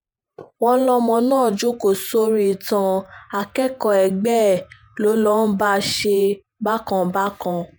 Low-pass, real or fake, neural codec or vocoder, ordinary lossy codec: none; fake; vocoder, 48 kHz, 128 mel bands, Vocos; none